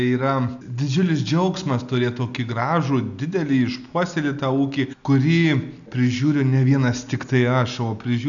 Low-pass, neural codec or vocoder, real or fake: 7.2 kHz; none; real